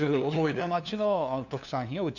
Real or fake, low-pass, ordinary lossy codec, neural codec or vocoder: fake; 7.2 kHz; none; codec, 16 kHz, 2 kbps, FunCodec, trained on LibriTTS, 25 frames a second